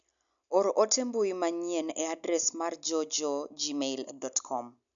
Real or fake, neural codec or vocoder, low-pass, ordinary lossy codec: real; none; 7.2 kHz; none